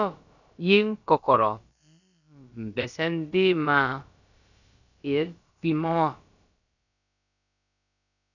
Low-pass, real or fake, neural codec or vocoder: 7.2 kHz; fake; codec, 16 kHz, about 1 kbps, DyCAST, with the encoder's durations